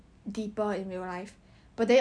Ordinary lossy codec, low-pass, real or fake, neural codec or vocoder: none; 9.9 kHz; real; none